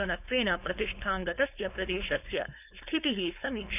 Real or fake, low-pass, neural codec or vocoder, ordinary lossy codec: fake; 3.6 kHz; codec, 16 kHz, 4.8 kbps, FACodec; none